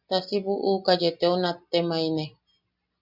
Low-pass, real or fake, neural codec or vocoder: 5.4 kHz; real; none